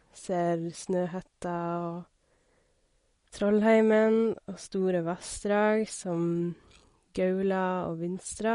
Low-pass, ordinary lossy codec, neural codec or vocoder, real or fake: 10.8 kHz; MP3, 48 kbps; none; real